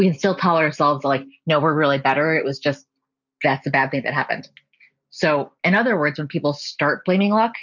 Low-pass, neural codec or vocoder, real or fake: 7.2 kHz; none; real